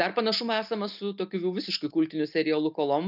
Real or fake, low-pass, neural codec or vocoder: real; 5.4 kHz; none